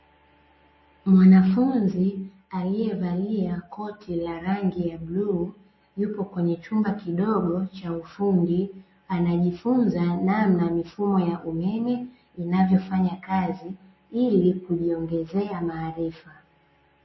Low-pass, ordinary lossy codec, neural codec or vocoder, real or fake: 7.2 kHz; MP3, 24 kbps; none; real